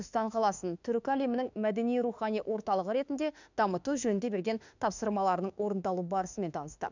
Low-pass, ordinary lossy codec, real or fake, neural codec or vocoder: 7.2 kHz; none; fake; autoencoder, 48 kHz, 32 numbers a frame, DAC-VAE, trained on Japanese speech